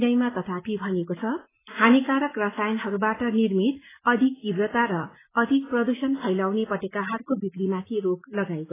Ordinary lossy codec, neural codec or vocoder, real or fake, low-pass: AAC, 16 kbps; none; real; 3.6 kHz